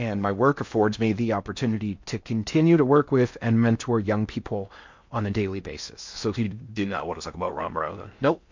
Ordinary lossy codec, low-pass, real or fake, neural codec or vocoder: MP3, 48 kbps; 7.2 kHz; fake; codec, 16 kHz in and 24 kHz out, 0.6 kbps, FocalCodec, streaming, 4096 codes